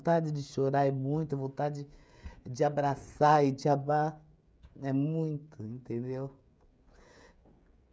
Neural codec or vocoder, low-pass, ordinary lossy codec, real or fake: codec, 16 kHz, 16 kbps, FreqCodec, smaller model; none; none; fake